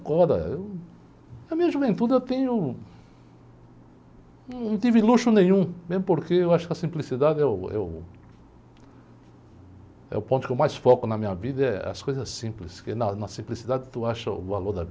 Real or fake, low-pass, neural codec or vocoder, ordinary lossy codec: real; none; none; none